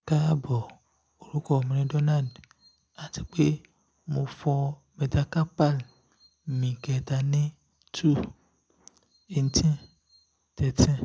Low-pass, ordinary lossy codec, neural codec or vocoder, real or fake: none; none; none; real